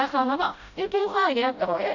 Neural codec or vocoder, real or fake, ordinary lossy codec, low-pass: codec, 16 kHz, 0.5 kbps, FreqCodec, smaller model; fake; none; 7.2 kHz